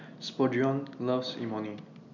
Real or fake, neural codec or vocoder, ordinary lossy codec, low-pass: real; none; none; 7.2 kHz